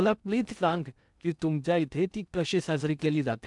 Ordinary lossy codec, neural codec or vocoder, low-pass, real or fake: none; codec, 16 kHz in and 24 kHz out, 0.6 kbps, FocalCodec, streaming, 2048 codes; 10.8 kHz; fake